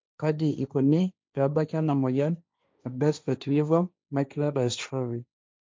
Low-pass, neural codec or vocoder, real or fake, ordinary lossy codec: none; codec, 16 kHz, 1.1 kbps, Voila-Tokenizer; fake; none